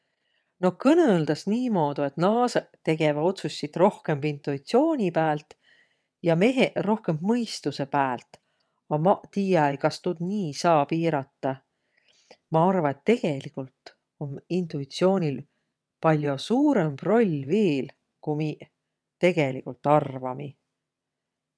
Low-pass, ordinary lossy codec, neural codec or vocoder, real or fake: none; none; vocoder, 22.05 kHz, 80 mel bands, WaveNeXt; fake